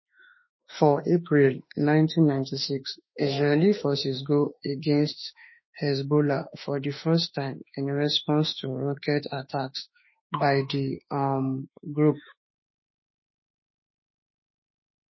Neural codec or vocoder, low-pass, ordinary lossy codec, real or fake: autoencoder, 48 kHz, 32 numbers a frame, DAC-VAE, trained on Japanese speech; 7.2 kHz; MP3, 24 kbps; fake